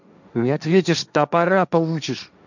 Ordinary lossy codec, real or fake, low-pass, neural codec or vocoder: none; fake; 7.2 kHz; codec, 16 kHz, 1.1 kbps, Voila-Tokenizer